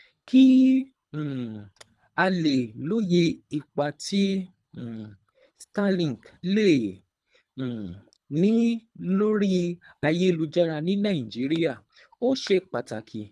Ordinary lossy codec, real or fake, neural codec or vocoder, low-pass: none; fake; codec, 24 kHz, 3 kbps, HILCodec; none